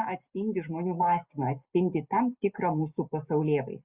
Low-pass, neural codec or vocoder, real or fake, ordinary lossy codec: 3.6 kHz; none; real; Opus, 64 kbps